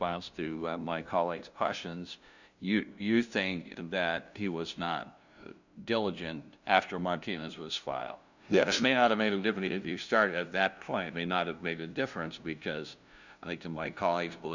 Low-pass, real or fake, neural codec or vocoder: 7.2 kHz; fake; codec, 16 kHz, 0.5 kbps, FunCodec, trained on LibriTTS, 25 frames a second